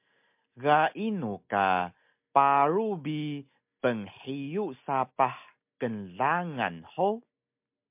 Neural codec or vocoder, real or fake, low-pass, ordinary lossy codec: none; real; 3.6 kHz; MP3, 32 kbps